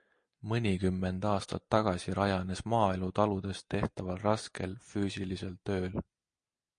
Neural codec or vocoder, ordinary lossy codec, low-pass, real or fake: none; MP3, 48 kbps; 9.9 kHz; real